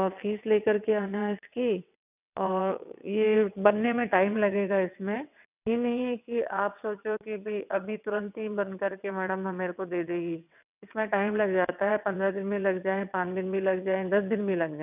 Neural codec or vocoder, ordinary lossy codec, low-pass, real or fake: vocoder, 22.05 kHz, 80 mel bands, WaveNeXt; none; 3.6 kHz; fake